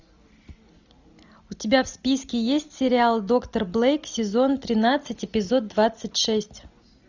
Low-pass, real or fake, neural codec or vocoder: 7.2 kHz; real; none